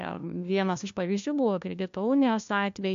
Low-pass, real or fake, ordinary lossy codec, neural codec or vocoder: 7.2 kHz; fake; AAC, 64 kbps; codec, 16 kHz, 1 kbps, FunCodec, trained on LibriTTS, 50 frames a second